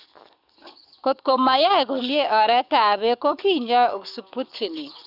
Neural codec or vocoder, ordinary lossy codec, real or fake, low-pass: codec, 44.1 kHz, 7.8 kbps, DAC; none; fake; 5.4 kHz